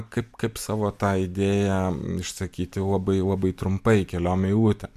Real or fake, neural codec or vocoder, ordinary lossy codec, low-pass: real; none; MP3, 96 kbps; 14.4 kHz